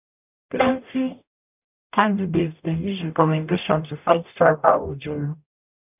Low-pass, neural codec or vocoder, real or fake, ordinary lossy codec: 3.6 kHz; codec, 44.1 kHz, 0.9 kbps, DAC; fake; none